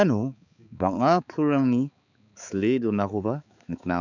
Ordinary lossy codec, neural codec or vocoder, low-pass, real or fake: none; codec, 16 kHz, 4 kbps, X-Codec, HuBERT features, trained on balanced general audio; 7.2 kHz; fake